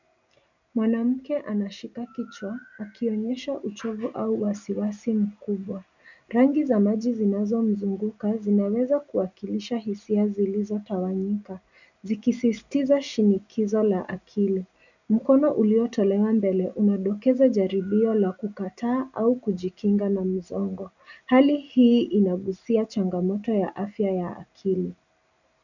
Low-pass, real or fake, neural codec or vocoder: 7.2 kHz; real; none